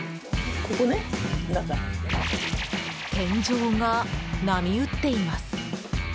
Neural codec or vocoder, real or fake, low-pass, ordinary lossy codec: none; real; none; none